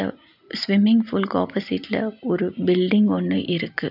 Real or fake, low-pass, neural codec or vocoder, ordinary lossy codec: real; 5.4 kHz; none; none